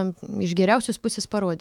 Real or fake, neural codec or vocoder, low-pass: fake; autoencoder, 48 kHz, 128 numbers a frame, DAC-VAE, trained on Japanese speech; 19.8 kHz